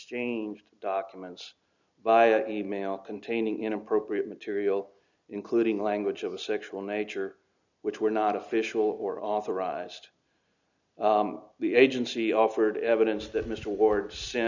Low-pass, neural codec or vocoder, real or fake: 7.2 kHz; none; real